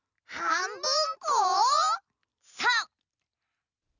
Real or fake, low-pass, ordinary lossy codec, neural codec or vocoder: real; 7.2 kHz; none; none